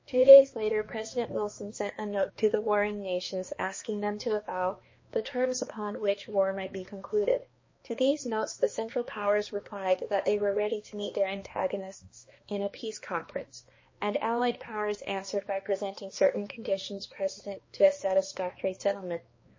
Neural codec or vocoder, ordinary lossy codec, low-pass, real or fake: codec, 16 kHz, 2 kbps, X-Codec, HuBERT features, trained on balanced general audio; MP3, 32 kbps; 7.2 kHz; fake